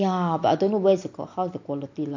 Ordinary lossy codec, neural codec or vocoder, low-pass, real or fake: AAC, 48 kbps; none; 7.2 kHz; real